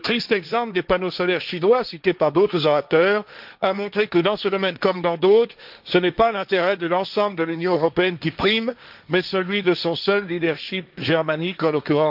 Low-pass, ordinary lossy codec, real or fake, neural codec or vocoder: 5.4 kHz; none; fake; codec, 16 kHz, 1.1 kbps, Voila-Tokenizer